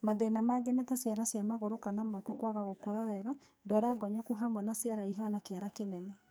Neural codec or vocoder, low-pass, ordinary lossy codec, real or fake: codec, 44.1 kHz, 2.6 kbps, SNAC; none; none; fake